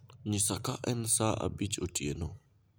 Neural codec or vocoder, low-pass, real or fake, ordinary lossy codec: none; none; real; none